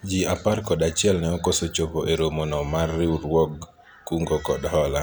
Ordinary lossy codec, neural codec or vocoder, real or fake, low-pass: none; none; real; none